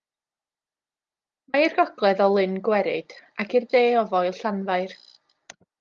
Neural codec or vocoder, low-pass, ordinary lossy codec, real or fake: none; 7.2 kHz; Opus, 32 kbps; real